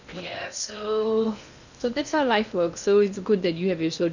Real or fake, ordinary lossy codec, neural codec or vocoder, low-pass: fake; none; codec, 16 kHz in and 24 kHz out, 0.6 kbps, FocalCodec, streaming, 2048 codes; 7.2 kHz